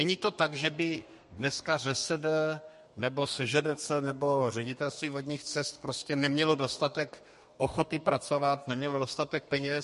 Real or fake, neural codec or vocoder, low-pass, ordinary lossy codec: fake; codec, 32 kHz, 1.9 kbps, SNAC; 14.4 kHz; MP3, 48 kbps